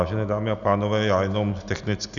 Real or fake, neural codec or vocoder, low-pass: real; none; 7.2 kHz